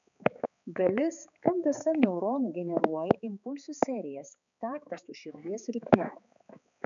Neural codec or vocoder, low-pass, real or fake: codec, 16 kHz, 4 kbps, X-Codec, HuBERT features, trained on balanced general audio; 7.2 kHz; fake